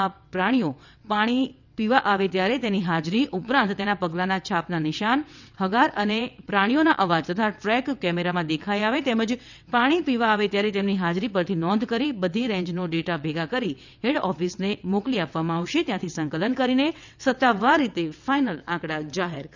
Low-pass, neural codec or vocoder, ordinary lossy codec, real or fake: 7.2 kHz; vocoder, 22.05 kHz, 80 mel bands, WaveNeXt; none; fake